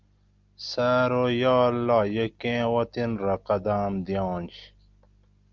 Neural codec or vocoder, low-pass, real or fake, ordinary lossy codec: none; 7.2 kHz; real; Opus, 32 kbps